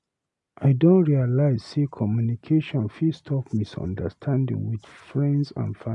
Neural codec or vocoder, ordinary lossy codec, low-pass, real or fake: none; none; 10.8 kHz; real